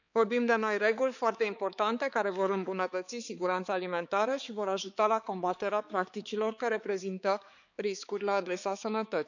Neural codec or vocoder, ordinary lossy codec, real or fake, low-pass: codec, 16 kHz, 4 kbps, X-Codec, HuBERT features, trained on balanced general audio; none; fake; 7.2 kHz